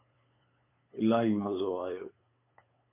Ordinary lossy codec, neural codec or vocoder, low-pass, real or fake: MP3, 24 kbps; codec, 16 kHz, 8 kbps, FunCodec, trained on LibriTTS, 25 frames a second; 3.6 kHz; fake